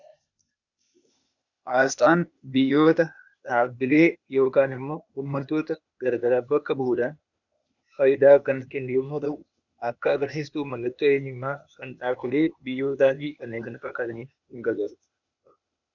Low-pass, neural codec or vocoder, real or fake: 7.2 kHz; codec, 16 kHz, 0.8 kbps, ZipCodec; fake